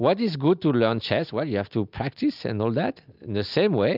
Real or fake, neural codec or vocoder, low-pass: real; none; 5.4 kHz